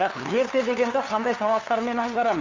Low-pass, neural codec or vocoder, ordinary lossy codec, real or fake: 7.2 kHz; codec, 16 kHz, 2 kbps, FunCodec, trained on LibriTTS, 25 frames a second; Opus, 32 kbps; fake